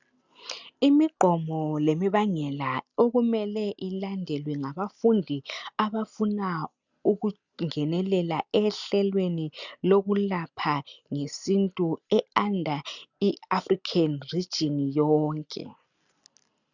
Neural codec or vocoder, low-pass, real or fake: none; 7.2 kHz; real